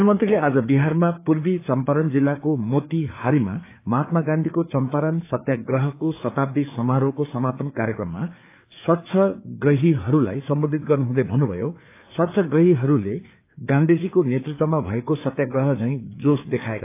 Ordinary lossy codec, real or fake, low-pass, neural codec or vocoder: AAC, 24 kbps; fake; 3.6 kHz; codec, 16 kHz, 4 kbps, FreqCodec, larger model